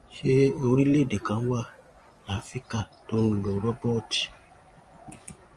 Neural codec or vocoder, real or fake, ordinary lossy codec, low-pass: vocoder, 24 kHz, 100 mel bands, Vocos; fake; Opus, 32 kbps; 10.8 kHz